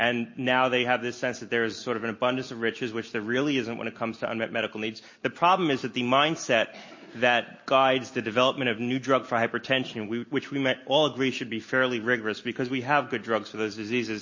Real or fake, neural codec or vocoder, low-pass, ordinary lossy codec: real; none; 7.2 kHz; MP3, 32 kbps